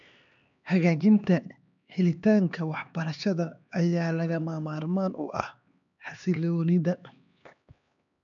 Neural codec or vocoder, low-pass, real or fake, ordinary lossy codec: codec, 16 kHz, 2 kbps, X-Codec, HuBERT features, trained on LibriSpeech; 7.2 kHz; fake; none